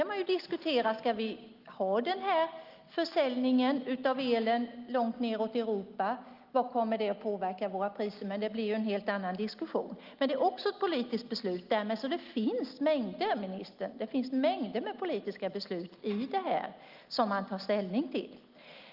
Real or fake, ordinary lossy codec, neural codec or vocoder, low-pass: real; Opus, 32 kbps; none; 5.4 kHz